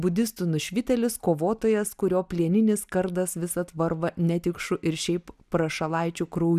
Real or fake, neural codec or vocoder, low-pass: real; none; 14.4 kHz